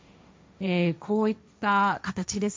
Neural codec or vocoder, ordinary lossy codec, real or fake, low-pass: codec, 16 kHz, 1.1 kbps, Voila-Tokenizer; none; fake; none